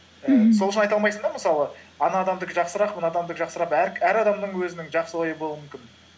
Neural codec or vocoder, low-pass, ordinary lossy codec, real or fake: none; none; none; real